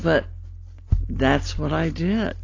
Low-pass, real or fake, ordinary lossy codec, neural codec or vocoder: 7.2 kHz; real; AAC, 32 kbps; none